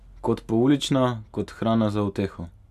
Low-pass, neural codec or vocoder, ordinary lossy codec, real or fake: 14.4 kHz; none; none; real